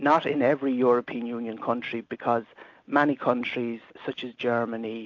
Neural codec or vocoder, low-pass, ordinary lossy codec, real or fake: none; 7.2 kHz; AAC, 48 kbps; real